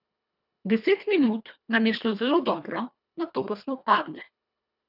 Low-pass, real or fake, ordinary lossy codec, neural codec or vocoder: 5.4 kHz; fake; none; codec, 24 kHz, 1.5 kbps, HILCodec